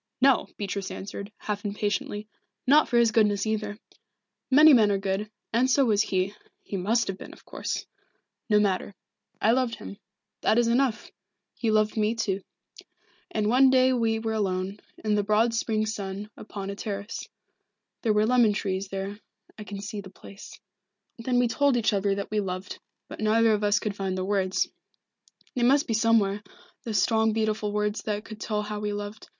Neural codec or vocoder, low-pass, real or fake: none; 7.2 kHz; real